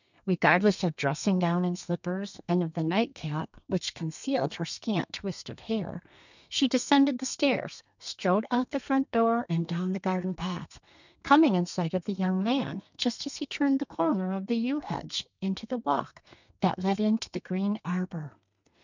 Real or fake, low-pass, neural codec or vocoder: fake; 7.2 kHz; codec, 32 kHz, 1.9 kbps, SNAC